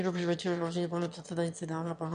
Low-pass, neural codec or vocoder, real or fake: 9.9 kHz; autoencoder, 22.05 kHz, a latent of 192 numbers a frame, VITS, trained on one speaker; fake